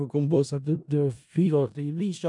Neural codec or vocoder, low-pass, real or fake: codec, 16 kHz in and 24 kHz out, 0.4 kbps, LongCat-Audio-Codec, four codebook decoder; 10.8 kHz; fake